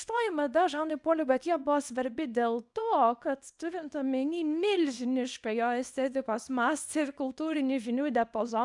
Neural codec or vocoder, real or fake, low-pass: codec, 24 kHz, 0.9 kbps, WavTokenizer, small release; fake; 10.8 kHz